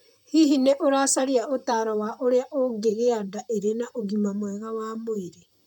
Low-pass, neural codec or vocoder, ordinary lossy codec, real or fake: 19.8 kHz; vocoder, 44.1 kHz, 128 mel bands, Pupu-Vocoder; none; fake